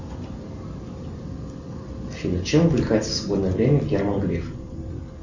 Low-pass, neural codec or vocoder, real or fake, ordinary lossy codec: 7.2 kHz; none; real; Opus, 64 kbps